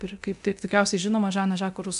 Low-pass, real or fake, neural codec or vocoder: 10.8 kHz; fake; codec, 24 kHz, 0.9 kbps, DualCodec